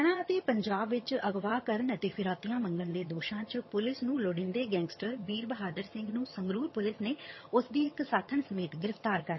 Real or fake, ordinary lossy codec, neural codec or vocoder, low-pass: fake; MP3, 24 kbps; vocoder, 22.05 kHz, 80 mel bands, HiFi-GAN; 7.2 kHz